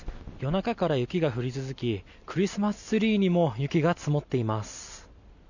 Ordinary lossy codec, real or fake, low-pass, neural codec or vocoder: none; real; 7.2 kHz; none